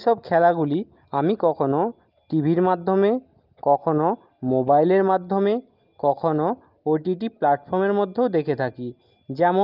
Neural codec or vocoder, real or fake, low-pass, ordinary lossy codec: none; real; 5.4 kHz; Opus, 24 kbps